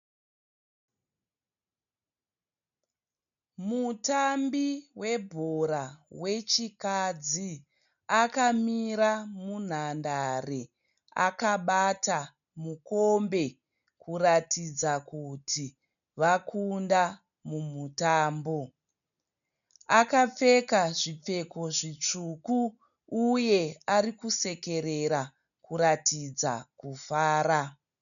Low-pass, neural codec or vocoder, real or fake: 7.2 kHz; none; real